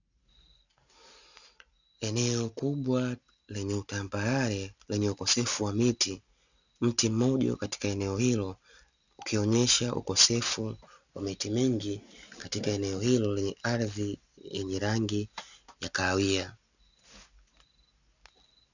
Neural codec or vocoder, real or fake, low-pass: none; real; 7.2 kHz